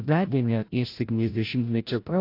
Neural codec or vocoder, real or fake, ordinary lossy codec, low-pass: codec, 16 kHz, 0.5 kbps, FreqCodec, larger model; fake; AAC, 32 kbps; 5.4 kHz